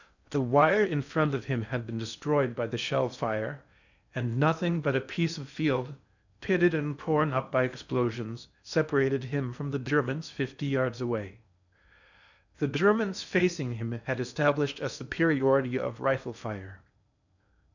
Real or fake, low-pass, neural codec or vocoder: fake; 7.2 kHz; codec, 16 kHz in and 24 kHz out, 0.8 kbps, FocalCodec, streaming, 65536 codes